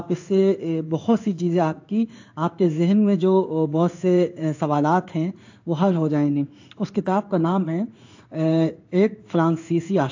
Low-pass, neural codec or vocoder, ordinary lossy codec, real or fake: 7.2 kHz; codec, 16 kHz in and 24 kHz out, 1 kbps, XY-Tokenizer; AAC, 48 kbps; fake